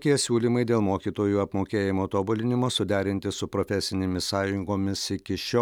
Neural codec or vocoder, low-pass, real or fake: none; 19.8 kHz; real